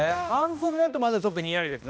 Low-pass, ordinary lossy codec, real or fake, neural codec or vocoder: none; none; fake; codec, 16 kHz, 1 kbps, X-Codec, HuBERT features, trained on balanced general audio